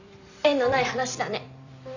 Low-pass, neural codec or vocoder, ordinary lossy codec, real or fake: 7.2 kHz; vocoder, 44.1 kHz, 128 mel bands every 256 samples, BigVGAN v2; none; fake